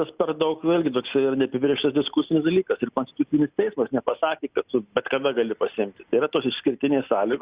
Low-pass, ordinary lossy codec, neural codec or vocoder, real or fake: 3.6 kHz; Opus, 64 kbps; none; real